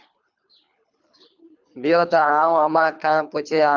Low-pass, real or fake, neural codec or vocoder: 7.2 kHz; fake; codec, 24 kHz, 3 kbps, HILCodec